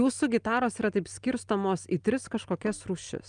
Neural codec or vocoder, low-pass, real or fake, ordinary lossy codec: none; 9.9 kHz; real; Opus, 32 kbps